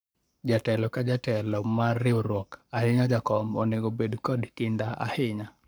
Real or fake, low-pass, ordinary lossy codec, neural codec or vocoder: fake; none; none; codec, 44.1 kHz, 7.8 kbps, Pupu-Codec